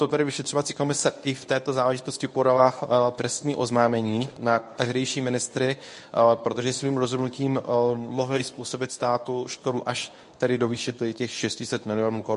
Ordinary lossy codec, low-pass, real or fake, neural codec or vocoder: MP3, 48 kbps; 10.8 kHz; fake; codec, 24 kHz, 0.9 kbps, WavTokenizer, medium speech release version 1